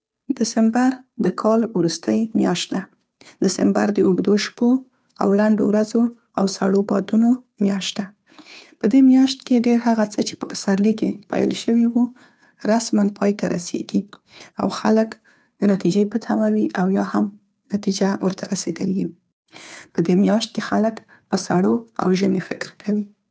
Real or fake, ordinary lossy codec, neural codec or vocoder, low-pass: fake; none; codec, 16 kHz, 2 kbps, FunCodec, trained on Chinese and English, 25 frames a second; none